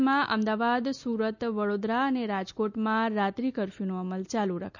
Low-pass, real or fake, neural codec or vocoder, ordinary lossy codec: 7.2 kHz; real; none; none